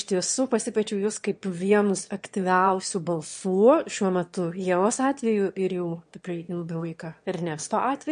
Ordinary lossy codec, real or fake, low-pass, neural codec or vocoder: MP3, 48 kbps; fake; 9.9 kHz; autoencoder, 22.05 kHz, a latent of 192 numbers a frame, VITS, trained on one speaker